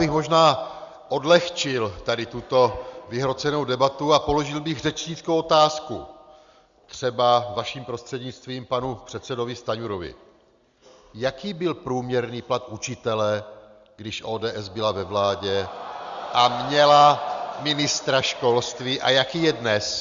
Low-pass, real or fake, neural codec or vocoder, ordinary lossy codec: 7.2 kHz; real; none; Opus, 64 kbps